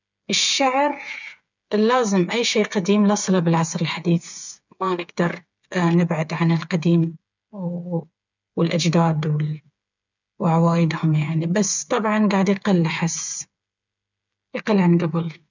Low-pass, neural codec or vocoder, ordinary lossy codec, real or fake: 7.2 kHz; codec, 16 kHz, 8 kbps, FreqCodec, smaller model; none; fake